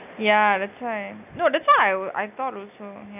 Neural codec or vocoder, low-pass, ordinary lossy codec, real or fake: none; 3.6 kHz; none; real